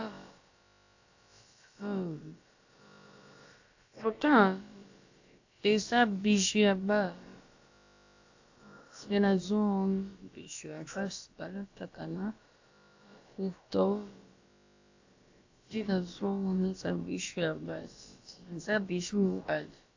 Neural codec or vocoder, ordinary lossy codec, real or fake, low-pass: codec, 16 kHz, about 1 kbps, DyCAST, with the encoder's durations; AAC, 48 kbps; fake; 7.2 kHz